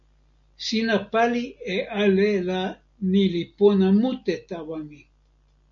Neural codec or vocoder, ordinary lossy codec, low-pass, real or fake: none; MP3, 96 kbps; 7.2 kHz; real